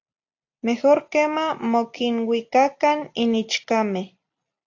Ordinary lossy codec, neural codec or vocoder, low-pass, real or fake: AAC, 48 kbps; none; 7.2 kHz; real